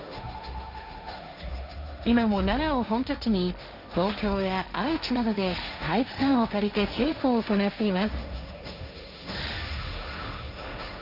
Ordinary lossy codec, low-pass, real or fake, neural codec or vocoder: none; 5.4 kHz; fake; codec, 16 kHz, 1.1 kbps, Voila-Tokenizer